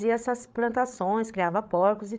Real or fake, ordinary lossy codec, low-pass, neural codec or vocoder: fake; none; none; codec, 16 kHz, 8 kbps, FreqCodec, larger model